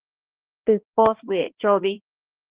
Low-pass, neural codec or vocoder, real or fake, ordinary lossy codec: 3.6 kHz; codec, 16 kHz, 2 kbps, X-Codec, HuBERT features, trained on balanced general audio; fake; Opus, 16 kbps